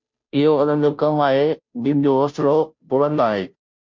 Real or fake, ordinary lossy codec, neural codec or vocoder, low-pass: fake; MP3, 48 kbps; codec, 16 kHz, 0.5 kbps, FunCodec, trained on Chinese and English, 25 frames a second; 7.2 kHz